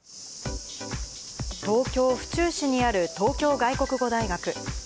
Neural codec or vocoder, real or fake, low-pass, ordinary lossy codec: none; real; none; none